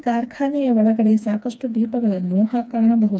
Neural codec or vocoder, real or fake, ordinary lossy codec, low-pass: codec, 16 kHz, 2 kbps, FreqCodec, smaller model; fake; none; none